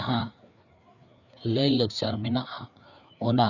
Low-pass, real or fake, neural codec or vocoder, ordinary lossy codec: 7.2 kHz; fake; codec, 16 kHz, 4 kbps, FreqCodec, larger model; none